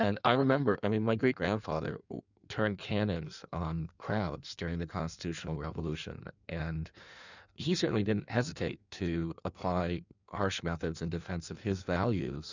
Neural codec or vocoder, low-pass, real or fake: codec, 16 kHz in and 24 kHz out, 1.1 kbps, FireRedTTS-2 codec; 7.2 kHz; fake